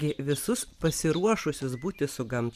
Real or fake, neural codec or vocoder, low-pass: fake; vocoder, 44.1 kHz, 128 mel bands every 512 samples, BigVGAN v2; 14.4 kHz